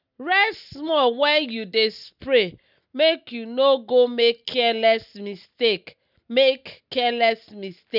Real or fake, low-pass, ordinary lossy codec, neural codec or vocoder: real; 5.4 kHz; none; none